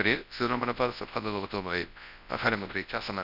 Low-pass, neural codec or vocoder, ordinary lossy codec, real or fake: 5.4 kHz; codec, 24 kHz, 0.9 kbps, WavTokenizer, large speech release; none; fake